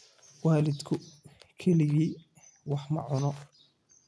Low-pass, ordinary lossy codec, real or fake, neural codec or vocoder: none; none; real; none